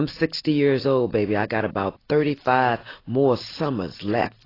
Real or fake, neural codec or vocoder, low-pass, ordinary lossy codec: real; none; 5.4 kHz; AAC, 24 kbps